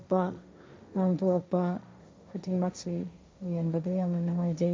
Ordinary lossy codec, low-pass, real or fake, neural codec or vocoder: none; none; fake; codec, 16 kHz, 1.1 kbps, Voila-Tokenizer